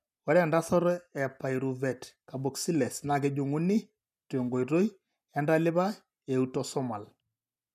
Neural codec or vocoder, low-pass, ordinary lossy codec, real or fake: none; 14.4 kHz; none; real